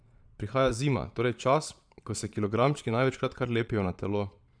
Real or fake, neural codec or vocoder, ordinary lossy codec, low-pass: fake; vocoder, 22.05 kHz, 80 mel bands, Vocos; none; 9.9 kHz